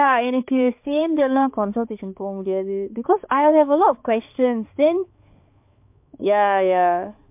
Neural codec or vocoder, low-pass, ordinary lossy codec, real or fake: codec, 16 kHz, 4 kbps, X-Codec, HuBERT features, trained on balanced general audio; 3.6 kHz; MP3, 32 kbps; fake